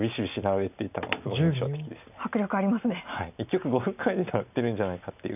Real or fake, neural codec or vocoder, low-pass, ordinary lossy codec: real; none; 3.6 kHz; none